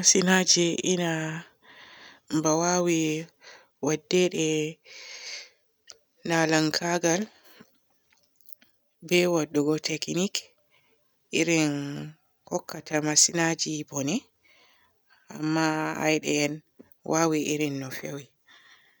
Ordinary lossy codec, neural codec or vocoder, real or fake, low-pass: none; none; real; none